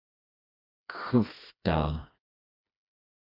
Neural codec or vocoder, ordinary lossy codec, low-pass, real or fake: codec, 16 kHz, 2 kbps, FreqCodec, smaller model; MP3, 48 kbps; 5.4 kHz; fake